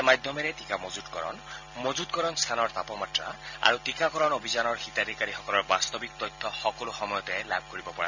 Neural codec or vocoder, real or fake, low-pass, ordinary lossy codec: none; real; 7.2 kHz; none